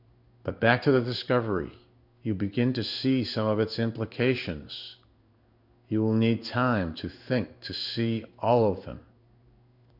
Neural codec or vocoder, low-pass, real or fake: codec, 16 kHz in and 24 kHz out, 1 kbps, XY-Tokenizer; 5.4 kHz; fake